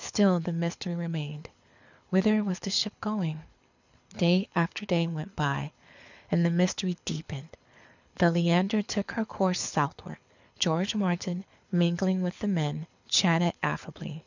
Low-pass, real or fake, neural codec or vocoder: 7.2 kHz; fake; codec, 24 kHz, 6 kbps, HILCodec